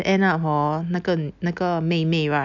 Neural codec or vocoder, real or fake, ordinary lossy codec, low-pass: none; real; none; 7.2 kHz